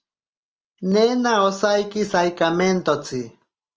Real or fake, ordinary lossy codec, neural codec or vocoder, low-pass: real; Opus, 24 kbps; none; 7.2 kHz